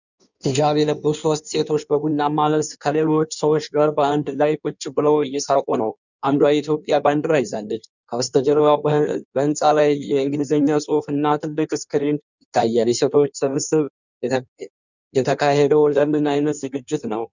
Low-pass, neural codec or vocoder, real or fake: 7.2 kHz; codec, 16 kHz in and 24 kHz out, 1.1 kbps, FireRedTTS-2 codec; fake